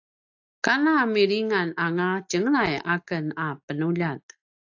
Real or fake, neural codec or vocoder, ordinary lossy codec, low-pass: real; none; AAC, 48 kbps; 7.2 kHz